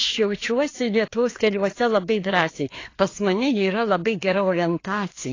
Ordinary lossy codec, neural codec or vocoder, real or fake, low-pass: AAC, 32 kbps; codec, 16 kHz, 2 kbps, FreqCodec, larger model; fake; 7.2 kHz